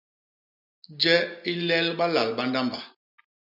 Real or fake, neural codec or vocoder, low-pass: real; none; 5.4 kHz